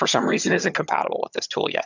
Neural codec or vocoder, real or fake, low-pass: vocoder, 22.05 kHz, 80 mel bands, HiFi-GAN; fake; 7.2 kHz